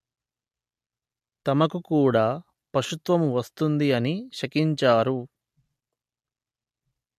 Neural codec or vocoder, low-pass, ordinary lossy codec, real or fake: none; 14.4 kHz; MP3, 64 kbps; real